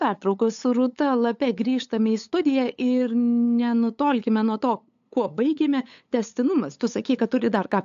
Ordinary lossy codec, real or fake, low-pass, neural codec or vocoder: AAC, 64 kbps; fake; 7.2 kHz; codec, 16 kHz, 8 kbps, FunCodec, trained on LibriTTS, 25 frames a second